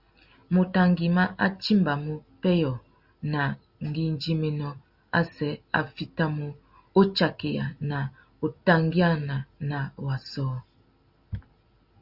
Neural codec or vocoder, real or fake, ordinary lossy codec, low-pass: none; real; Opus, 64 kbps; 5.4 kHz